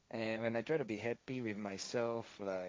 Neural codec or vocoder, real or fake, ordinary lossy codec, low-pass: codec, 16 kHz, 1.1 kbps, Voila-Tokenizer; fake; none; none